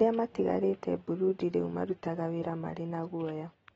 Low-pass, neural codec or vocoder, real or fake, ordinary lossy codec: 19.8 kHz; none; real; AAC, 24 kbps